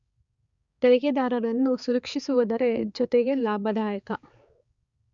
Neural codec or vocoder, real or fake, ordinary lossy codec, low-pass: codec, 16 kHz, 4 kbps, X-Codec, HuBERT features, trained on balanced general audio; fake; Opus, 64 kbps; 7.2 kHz